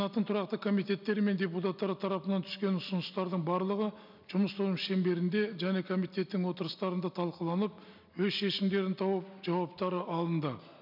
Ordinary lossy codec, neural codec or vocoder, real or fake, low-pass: none; none; real; 5.4 kHz